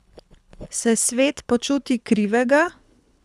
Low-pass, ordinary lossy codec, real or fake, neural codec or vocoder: none; none; fake; codec, 24 kHz, 6 kbps, HILCodec